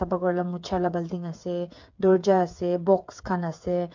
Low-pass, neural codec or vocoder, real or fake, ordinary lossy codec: 7.2 kHz; codec, 16 kHz, 8 kbps, FreqCodec, smaller model; fake; MP3, 64 kbps